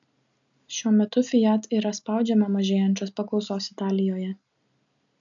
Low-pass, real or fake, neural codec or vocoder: 7.2 kHz; real; none